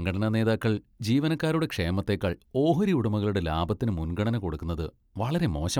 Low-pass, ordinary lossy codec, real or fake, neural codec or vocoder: 19.8 kHz; none; real; none